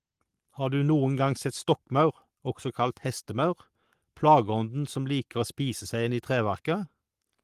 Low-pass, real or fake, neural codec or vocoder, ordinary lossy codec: 14.4 kHz; fake; codec, 44.1 kHz, 7.8 kbps, Pupu-Codec; Opus, 24 kbps